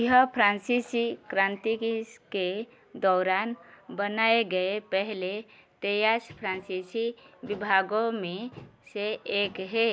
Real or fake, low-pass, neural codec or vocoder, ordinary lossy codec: real; none; none; none